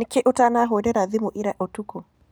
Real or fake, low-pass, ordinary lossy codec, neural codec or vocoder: real; none; none; none